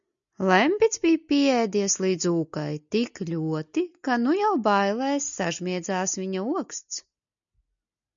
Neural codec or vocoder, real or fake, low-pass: none; real; 7.2 kHz